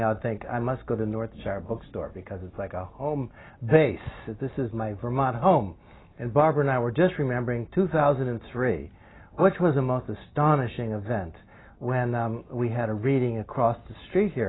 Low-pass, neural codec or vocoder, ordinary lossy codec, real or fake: 7.2 kHz; none; AAC, 16 kbps; real